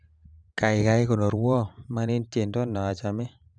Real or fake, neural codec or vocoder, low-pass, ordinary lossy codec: fake; vocoder, 44.1 kHz, 128 mel bands every 512 samples, BigVGAN v2; 9.9 kHz; none